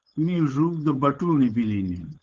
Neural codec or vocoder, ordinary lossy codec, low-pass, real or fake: codec, 16 kHz, 4.8 kbps, FACodec; Opus, 16 kbps; 7.2 kHz; fake